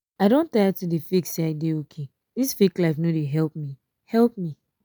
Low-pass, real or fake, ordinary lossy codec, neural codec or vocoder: none; real; none; none